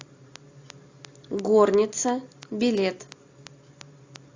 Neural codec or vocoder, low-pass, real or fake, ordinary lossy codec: none; 7.2 kHz; real; AAC, 48 kbps